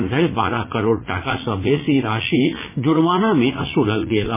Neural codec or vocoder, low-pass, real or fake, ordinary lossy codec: vocoder, 44.1 kHz, 80 mel bands, Vocos; 3.6 kHz; fake; MP3, 16 kbps